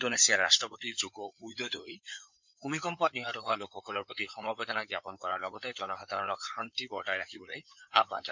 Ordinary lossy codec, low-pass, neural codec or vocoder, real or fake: MP3, 64 kbps; 7.2 kHz; codec, 16 kHz in and 24 kHz out, 2.2 kbps, FireRedTTS-2 codec; fake